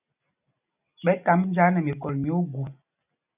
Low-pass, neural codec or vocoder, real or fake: 3.6 kHz; none; real